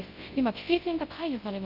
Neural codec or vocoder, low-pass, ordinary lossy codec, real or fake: codec, 24 kHz, 0.9 kbps, WavTokenizer, large speech release; 5.4 kHz; Opus, 32 kbps; fake